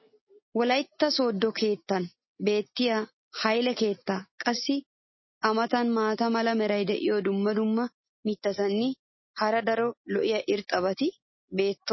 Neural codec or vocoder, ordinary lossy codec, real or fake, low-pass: none; MP3, 24 kbps; real; 7.2 kHz